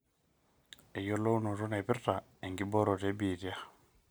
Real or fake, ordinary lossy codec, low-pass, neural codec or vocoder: real; none; none; none